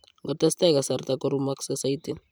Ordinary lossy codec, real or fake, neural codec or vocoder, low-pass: none; fake; vocoder, 44.1 kHz, 128 mel bands every 512 samples, BigVGAN v2; none